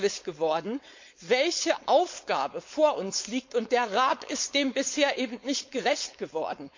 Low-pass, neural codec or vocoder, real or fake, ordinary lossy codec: 7.2 kHz; codec, 16 kHz, 4.8 kbps, FACodec; fake; MP3, 48 kbps